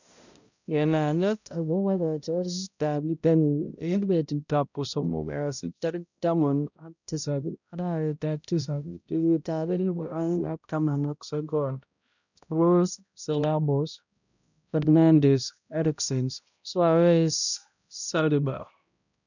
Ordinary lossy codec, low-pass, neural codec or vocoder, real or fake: none; 7.2 kHz; codec, 16 kHz, 0.5 kbps, X-Codec, HuBERT features, trained on balanced general audio; fake